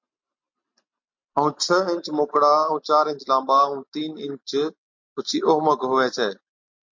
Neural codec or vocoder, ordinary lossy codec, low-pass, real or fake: none; MP3, 48 kbps; 7.2 kHz; real